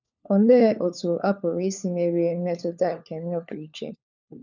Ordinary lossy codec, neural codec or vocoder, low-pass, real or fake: none; codec, 16 kHz, 4 kbps, FunCodec, trained on LibriTTS, 50 frames a second; 7.2 kHz; fake